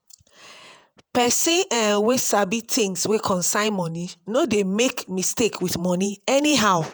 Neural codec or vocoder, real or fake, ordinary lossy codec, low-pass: vocoder, 48 kHz, 128 mel bands, Vocos; fake; none; none